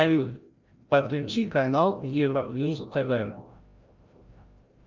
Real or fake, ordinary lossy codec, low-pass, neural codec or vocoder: fake; Opus, 24 kbps; 7.2 kHz; codec, 16 kHz, 0.5 kbps, FreqCodec, larger model